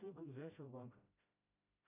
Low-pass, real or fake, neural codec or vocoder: 3.6 kHz; fake; codec, 16 kHz, 0.5 kbps, FreqCodec, smaller model